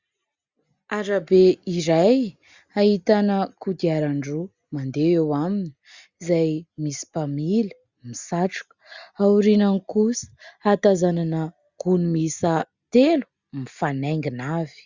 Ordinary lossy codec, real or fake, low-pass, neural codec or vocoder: Opus, 64 kbps; real; 7.2 kHz; none